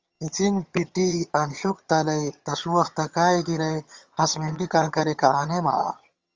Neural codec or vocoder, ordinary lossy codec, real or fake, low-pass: vocoder, 22.05 kHz, 80 mel bands, HiFi-GAN; Opus, 32 kbps; fake; 7.2 kHz